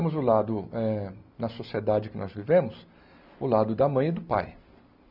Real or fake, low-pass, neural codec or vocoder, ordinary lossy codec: real; 5.4 kHz; none; none